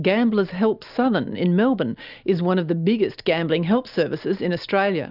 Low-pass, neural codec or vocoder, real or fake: 5.4 kHz; none; real